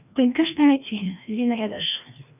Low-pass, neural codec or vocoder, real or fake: 3.6 kHz; codec, 16 kHz, 1 kbps, FreqCodec, larger model; fake